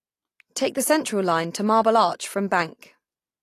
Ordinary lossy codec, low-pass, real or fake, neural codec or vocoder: AAC, 48 kbps; 14.4 kHz; real; none